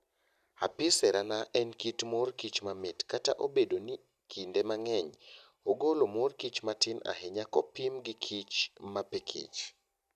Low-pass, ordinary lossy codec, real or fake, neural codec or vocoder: 14.4 kHz; none; real; none